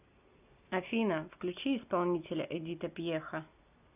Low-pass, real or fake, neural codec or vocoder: 3.6 kHz; real; none